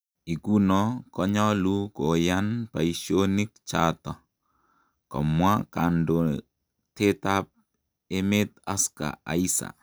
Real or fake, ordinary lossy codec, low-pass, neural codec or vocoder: real; none; none; none